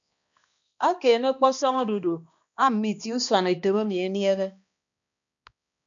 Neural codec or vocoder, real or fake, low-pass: codec, 16 kHz, 2 kbps, X-Codec, HuBERT features, trained on balanced general audio; fake; 7.2 kHz